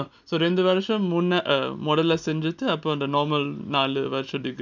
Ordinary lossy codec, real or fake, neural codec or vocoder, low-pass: none; real; none; 7.2 kHz